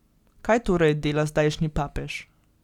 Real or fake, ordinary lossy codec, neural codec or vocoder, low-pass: real; Opus, 64 kbps; none; 19.8 kHz